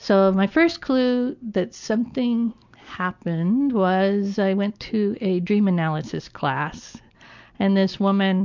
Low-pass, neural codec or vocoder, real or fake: 7.2 kHz; none; real